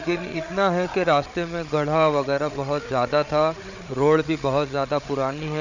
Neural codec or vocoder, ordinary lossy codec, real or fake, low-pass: codec, 16 kHz, 8 kbps, FreqCodec, larger model; none; fake; 7.2 kHz